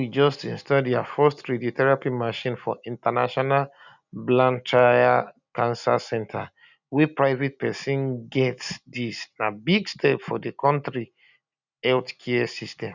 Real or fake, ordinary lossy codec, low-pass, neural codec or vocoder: real; none; 7.2 kHz; none